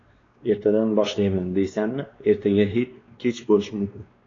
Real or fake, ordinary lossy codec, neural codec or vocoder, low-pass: fake; AAC, 32 kbps; codec, 16 kHz, 2 kbps, X-Codec, WavLM features, trained on Multilingual LibriSpeech; 7.2 kHz